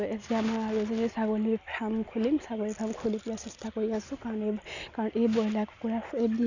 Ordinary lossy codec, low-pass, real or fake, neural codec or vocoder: none; 7.2 kHz; real; none